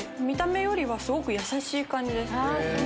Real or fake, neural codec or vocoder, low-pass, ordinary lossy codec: real; none; none; none